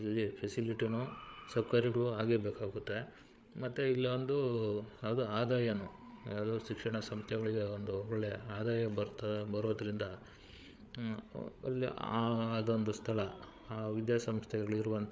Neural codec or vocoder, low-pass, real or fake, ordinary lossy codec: codec, 16 kHz, 8 kbps, FreqCodec, larger model; none; fake; none